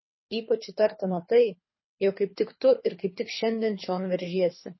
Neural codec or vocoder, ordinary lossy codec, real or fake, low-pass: codec, 24 kHz, 3 kbps, HILCodec; MP3, 24 kbps; fake; 7.2 kHz